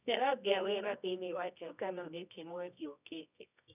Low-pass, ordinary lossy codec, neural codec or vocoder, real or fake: 3.6 kHz; none; codec, 24 kHz, 0.9 kbps, WavTokenizer, medium music audio release; fake